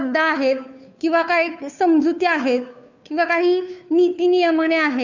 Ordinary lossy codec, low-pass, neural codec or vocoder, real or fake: none; 7.2 kHz; codec, 16 kHz, 2 kbps, FunCodec, trained on Chinese and English, 25 frames a second; fake